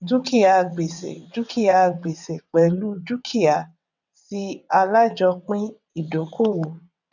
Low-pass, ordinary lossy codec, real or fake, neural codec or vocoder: 7.2 kHz; none; fake; vocoder, 22.05 kHz, 80 mel bands, WaveNeXt